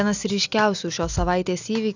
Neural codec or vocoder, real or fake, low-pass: none; real; 7.2 kHz